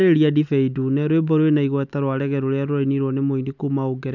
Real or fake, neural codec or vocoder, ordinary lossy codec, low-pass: real; none; none; 7.2 kHz